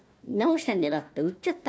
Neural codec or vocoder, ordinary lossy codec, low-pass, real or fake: codec, 16 kHz, 1 kbps, FunCodec, trained on Chinese and English, 50 frames a second; none; none; fake